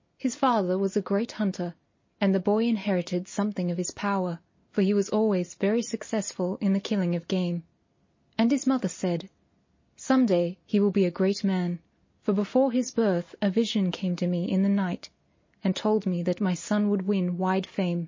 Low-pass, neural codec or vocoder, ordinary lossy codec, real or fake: 7.2 kHz; none; MP3, 32 kbps; real